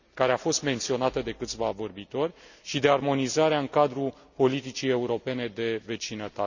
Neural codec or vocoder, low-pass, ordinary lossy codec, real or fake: none; 7.2 kHz; none; real